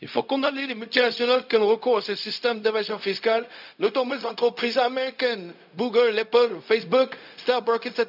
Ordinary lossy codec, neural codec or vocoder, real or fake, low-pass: none; codec, 16 kHz, 0.4 kbps, LongCat-Audio-Codec; fake; 5.4 kHz